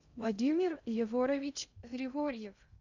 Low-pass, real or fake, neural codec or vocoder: 7.2 kHz; fake; codec, 16 kHz in and 24 kHz out, 0.6 kbps, FocalCodec, streaming, 2048 codes